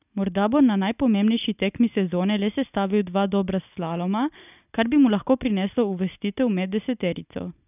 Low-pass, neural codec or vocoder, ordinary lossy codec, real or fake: 3.6 kHz; none; none; real